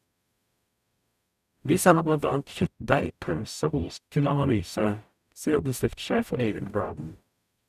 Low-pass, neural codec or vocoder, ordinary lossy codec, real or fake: 14.4 kHz; codec, 44.1 kHz, 0.9 kbps, DAC; none; fake